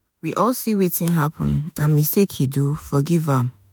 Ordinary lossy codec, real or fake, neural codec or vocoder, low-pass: none; fake; autoencoder, 48 kHz, 32 numbers a frame, DAC-VAE, trained on Japanese speech; none